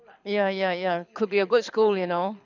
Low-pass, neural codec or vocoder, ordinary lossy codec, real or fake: 7.2 kHz; codec, 24 kHz, 6 kbps, HILCodec; none; fake